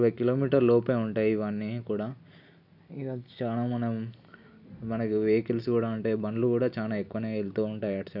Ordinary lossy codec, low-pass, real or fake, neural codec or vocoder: none; 5.4 kHz; real; none